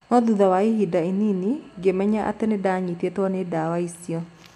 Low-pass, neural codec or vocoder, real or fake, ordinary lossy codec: 14.4 kHz; none; real; none